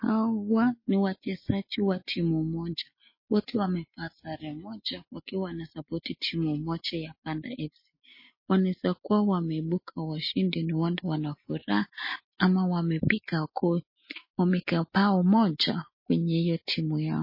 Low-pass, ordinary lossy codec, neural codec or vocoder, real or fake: 5.4 kHz; MP3, 24 kbps; none; real